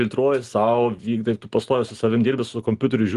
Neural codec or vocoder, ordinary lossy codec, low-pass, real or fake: none; Opus, 24 kbps; 10.8 kHz; real